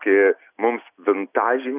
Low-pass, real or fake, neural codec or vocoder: 3.6 kHz; real; none